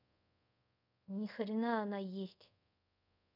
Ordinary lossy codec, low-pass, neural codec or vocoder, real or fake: none; 5.4 kHz; codec, 24 kHz, 0.5 kbps, DualCodec; fake